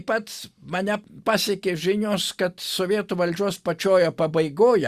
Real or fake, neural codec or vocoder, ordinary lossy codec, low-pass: real; none; Opus, 64 kbps; 14.4 kHz